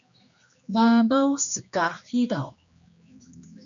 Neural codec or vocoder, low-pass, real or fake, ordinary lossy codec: codec, 16 kHz, 2 kbps, X-Codec, HuBERT features, trained on general audio; 7.2 kHz; fake; MP3, 64 kbps